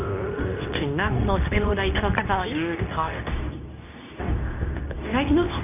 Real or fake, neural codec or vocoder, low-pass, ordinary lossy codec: fake; codec, 24 kHz, 0.9 kbps, WavTokenizer, medium speech release version 2; 3.6 kHz; none